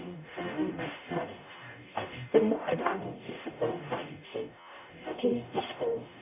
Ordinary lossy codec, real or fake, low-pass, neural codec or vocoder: none; fake; 3.6 kHz; codec, 44.1 kHz, 0.9 kbps, DAC